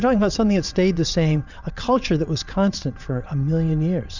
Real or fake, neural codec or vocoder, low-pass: fake; vocoder, 22.05 kHz, 80 mel bands, WaveNeXt; 7.2 kHz